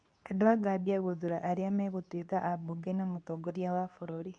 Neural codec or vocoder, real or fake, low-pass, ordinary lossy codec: codec, 24 kHz, 0.9 kbps, WavTokenizer, medium speech release version 2; fake; 9.9 kHz; none